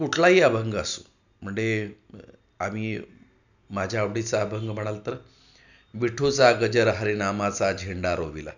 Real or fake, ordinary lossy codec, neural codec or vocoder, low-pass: real; none; none; 7.2 kHz